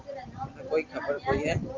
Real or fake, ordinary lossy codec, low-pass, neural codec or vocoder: real; Opus, 24 kbps; 7.2 kHz; none